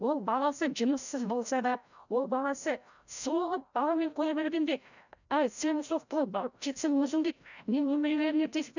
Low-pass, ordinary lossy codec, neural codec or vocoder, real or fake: 7.2 kHz; none; codec, 16 kHz, 0.5 kbps, FreqCodec, larger model; fake